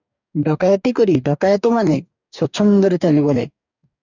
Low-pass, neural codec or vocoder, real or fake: 7.2 kHz; codec, 44.1 kHz, 2.6 kbps, DAC; fake